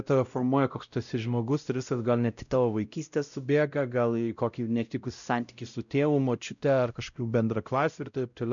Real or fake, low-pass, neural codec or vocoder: fake; 7.2 kHz; codec, 16 kHz, 0.5 kbps, X-Codec, WavLM features, trained on Multilingual LibriSpeech